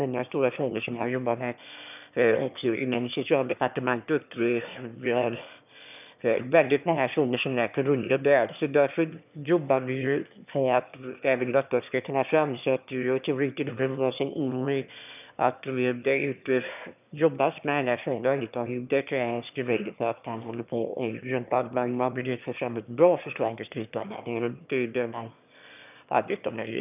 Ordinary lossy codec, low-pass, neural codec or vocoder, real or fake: none; 3.6 kHz; autoencoder, 22.05 kHz, a latent of 192 numbers a frame, VITS, trained on one speaker; fake